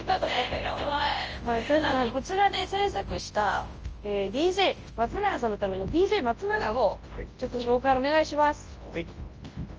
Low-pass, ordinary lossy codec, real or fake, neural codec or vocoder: 7.2 kHz; Opus, 24 kbps; fake; codec, 24 kHz, 0.9 kbps, WavTokenizer, large speech release